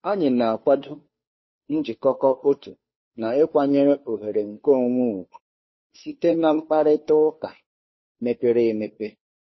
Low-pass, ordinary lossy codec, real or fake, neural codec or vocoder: 7.2 kHz; MP3, 24 kbps; fake; codec, 16 kHz, 2 kbps, FunCodec, trained on Chinese and English, 25 frames a second